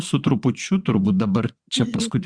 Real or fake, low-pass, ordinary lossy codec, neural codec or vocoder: fake; 9.9 kHz; Opus, 64 kbps; vocoder, 22.05 kHz, 80 mel bands, WaveNeXt